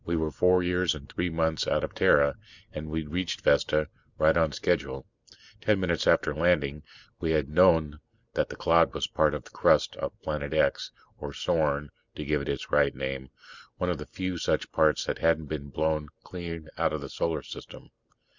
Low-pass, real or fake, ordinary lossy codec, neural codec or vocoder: 7.2 kHz; real; Opus, 64 kbps; none